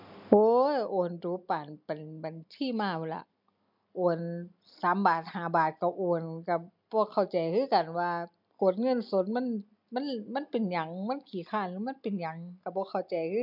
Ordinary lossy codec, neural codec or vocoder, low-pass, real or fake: MP3, 48 kbps; none; 5.4 kHz; real